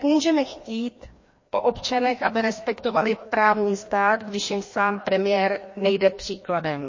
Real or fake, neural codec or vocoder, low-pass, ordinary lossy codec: fake; codec, 16 kHz, 1 kbps, FreqCodec, larger model; 7.2 kHz; MP3, 32 kbps